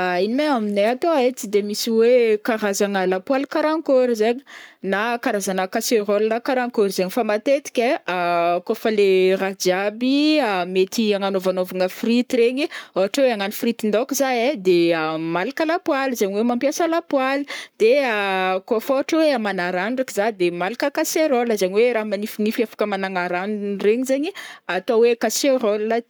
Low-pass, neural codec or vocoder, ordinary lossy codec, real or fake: none; codec, 44.1 kHz, 7.8 kbps, Pupu-Codec; none; fake